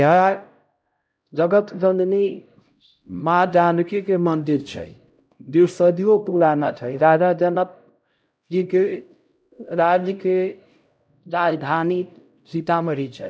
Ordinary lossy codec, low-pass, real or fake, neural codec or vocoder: none; none; fake; codec, 16 kHz, 0.5 kbps, X-Codec, HuBERT features, trained on LibriSpeech